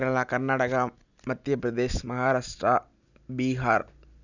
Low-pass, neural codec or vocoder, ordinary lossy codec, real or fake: 7.2 kHz; none; none; real